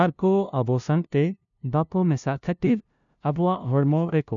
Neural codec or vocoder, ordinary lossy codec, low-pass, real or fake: codec, 16 kHz, 0.5 kbps, FunCodec, trained on LibriTTS, 25 frames a second; none; 7.2 kHz; fake